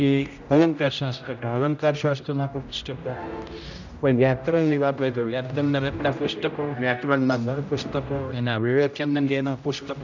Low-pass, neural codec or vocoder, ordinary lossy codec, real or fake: 7.2 kHz; codec, 16 kHz, 0.5 kbps, X-Codec, HuBERT features, trained on general audio; none; fake